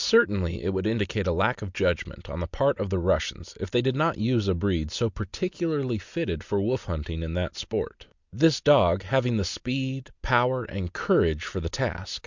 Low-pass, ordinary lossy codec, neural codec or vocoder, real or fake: 7.2 kHz; Opus, 64 kbps; none; real